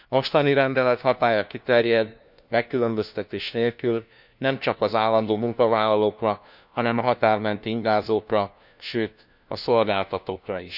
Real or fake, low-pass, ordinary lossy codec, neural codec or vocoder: fake; 5.4 kHz; none; codec, 16 kHz, 1 kbps, FunCodec, trained on LibriTTS, 50 frames a second